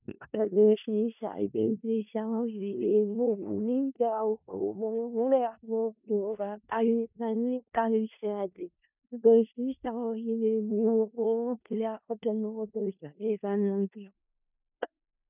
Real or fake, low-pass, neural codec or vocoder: fake; 3.6 kHz; codec, 16 kHz in and 24 kHz out, 0.4 kbps, LongCat-Audio-Codec, four codebook decoder